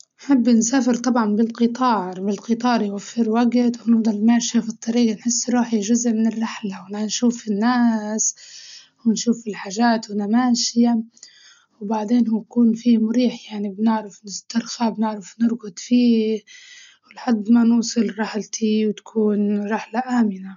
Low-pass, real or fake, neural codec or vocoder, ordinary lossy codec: 7.2 kHz; real; none; none